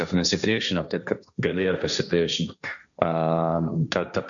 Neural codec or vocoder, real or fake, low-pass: codec, 16 kHz, 1.1 kbps, Voila-Tokenizer; fake; 7.2 kHz